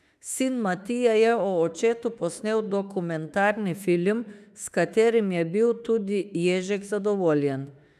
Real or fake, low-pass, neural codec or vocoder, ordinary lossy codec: fake; 14.4 kHz; autoencoder, 48 kHz, 32 numbers a frame, DAC-VAE, trained on Japanese speech; none